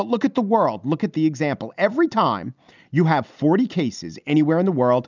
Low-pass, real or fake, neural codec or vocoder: 7.2 kHz; real; none